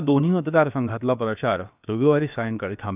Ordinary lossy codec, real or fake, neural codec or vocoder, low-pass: none; fake; codec, 16 kHz, 0.7 kbps, FocalCodec; 3.6 kHz